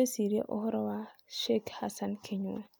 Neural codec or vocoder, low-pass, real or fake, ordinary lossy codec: none; none; real; none